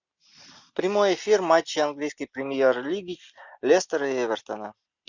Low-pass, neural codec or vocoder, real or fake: 7.2 kHz; none; real